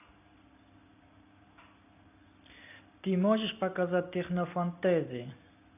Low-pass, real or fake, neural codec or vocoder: 3.6 kHz; real; none